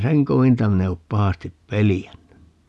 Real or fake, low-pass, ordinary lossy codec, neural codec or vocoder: real; none; none; none